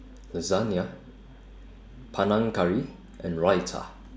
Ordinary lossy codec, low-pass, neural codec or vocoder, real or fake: none; none; none; real